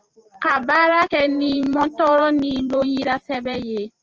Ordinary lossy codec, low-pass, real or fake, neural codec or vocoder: Opus, 24 kbps; 7.2 kHz; fake; vocoder, 44.1 kHz, 128 mel bands every 512 samples, BigVGAN v2